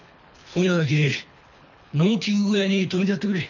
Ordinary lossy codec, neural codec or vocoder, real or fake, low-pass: none; codec, 24 kHz, 3 kbps, HILCodec; fake; 7.2 kHz